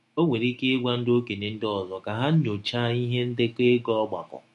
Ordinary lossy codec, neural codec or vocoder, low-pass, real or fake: MP3, 48 kbps; autoencoder, 48 kHz, 128 numbers a frame, DAC-VAE, trained on Japanese speech; 14.4 kHz; fake